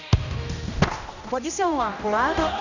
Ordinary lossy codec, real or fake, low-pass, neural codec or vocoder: none; fake; 7.2 kHz; codec, 16 kHz, 1 kbps, X-Codec, HuBERT features, trained on general audio